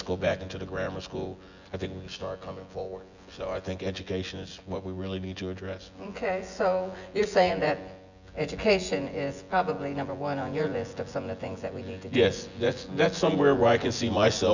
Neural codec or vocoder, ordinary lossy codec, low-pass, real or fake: vocoder, 24 kHz, 100 mel bands, Vocos; Opus, 64 kbps; 7.2 kHz; fake